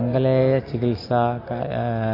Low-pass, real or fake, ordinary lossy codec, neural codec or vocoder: 5.4 kHz; real; AAC, 24 kbps; none